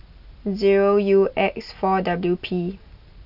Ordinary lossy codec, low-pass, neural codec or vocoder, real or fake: none; 5.4 kHz; none; real